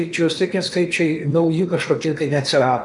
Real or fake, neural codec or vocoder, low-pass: fake; codec, 16 kHz in and 24 kHz out, 0.8 kbps, FocalCodec, streaming, 65536 codes; 10.8 kHz